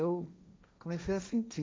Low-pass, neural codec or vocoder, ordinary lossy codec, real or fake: none; codec, 16 kHz, 1.1 kbps, Voila-Tokenizer; none; fake